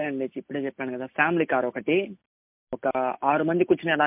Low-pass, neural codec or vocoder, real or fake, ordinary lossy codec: 3.6 kHz; none; real; MP3, 32 kbps